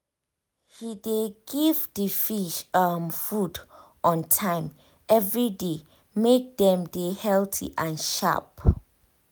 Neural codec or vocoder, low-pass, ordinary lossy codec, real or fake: none; none; none; real